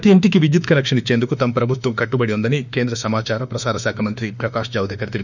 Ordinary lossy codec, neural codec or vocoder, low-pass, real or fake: none; autoencoder, 48 kHz, 32 numbers a frame, DAC-VAE, trained on Japanese speech; 7.2 kHz; fake